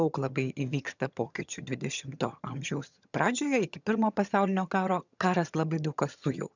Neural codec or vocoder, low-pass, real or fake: vocoder, 22.05 kHz, 80 mel bands, HiFi-GAN; 7.2 kHz; fake